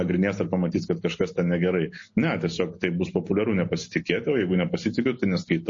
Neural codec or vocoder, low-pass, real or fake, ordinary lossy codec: none; 7.2 kHz; real; MP3, 32 kbps